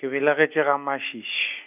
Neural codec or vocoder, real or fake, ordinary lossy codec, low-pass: none; real; none; 3.6 kHz